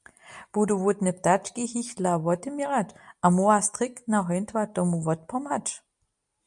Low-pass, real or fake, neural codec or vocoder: 10.8 kHz; real; none